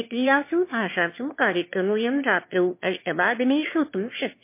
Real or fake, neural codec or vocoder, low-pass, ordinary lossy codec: fake; autoencoder, 22.05 kHz, a latent of 192 numbers a frame, VITS, trained on one speaker; 3.6 kHz; MP3, 24 kbps